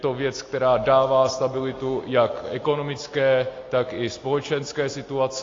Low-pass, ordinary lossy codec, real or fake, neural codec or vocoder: 7.2 kHz; AAC, 32 kbps; real; none